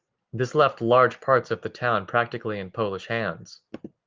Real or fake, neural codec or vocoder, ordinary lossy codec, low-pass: real; none; Opus, 24 kbps; 7.2 kHz